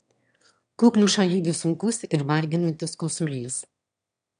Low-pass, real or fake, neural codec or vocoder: 9.9 kHz; fake; autoencoder, 22.05 kHz, a latent of 192 numbers a frame, VITS, trained on one speaker